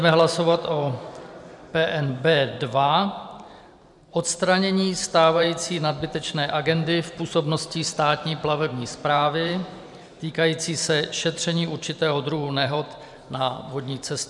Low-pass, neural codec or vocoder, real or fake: 10.8 kHz; vocoder, 44.1 kHz, 128 mel bands every 512 samples, BigVGAN v2; fake